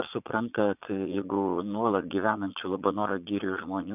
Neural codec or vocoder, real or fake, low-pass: vocoder, 22.05 kHz, 80 mel bands, Vocos; fake; 3.6 kHz